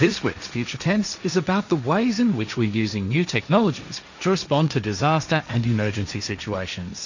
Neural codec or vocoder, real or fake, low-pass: codec, 16 kHz, 1.1 kbps, Voila-Tokenizer; fake; 7.2 kHz